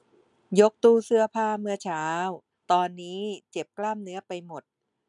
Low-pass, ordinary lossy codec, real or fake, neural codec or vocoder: 10.8 kHz; none; real; none